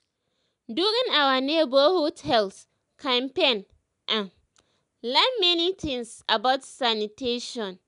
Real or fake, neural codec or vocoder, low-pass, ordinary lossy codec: real; none; 10.8 kHz; none